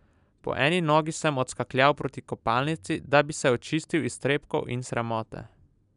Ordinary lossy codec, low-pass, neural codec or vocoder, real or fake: none; 10.8 kHz; none; real